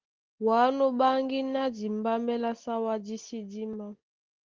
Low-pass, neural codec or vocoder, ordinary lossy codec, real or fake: 7.2 kHz; none; Opus, 16 kbps; real